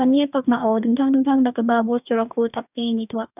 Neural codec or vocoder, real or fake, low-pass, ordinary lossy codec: codec, 44.1 kHz, 2.6 kbps, DAC; fake; 3.6 kHz; none